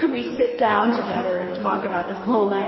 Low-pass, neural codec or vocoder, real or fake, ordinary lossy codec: 7.2 kHz; codec, 44.1 kHz, 2.6 kbps, DAC; fake; MP3, 24 kbps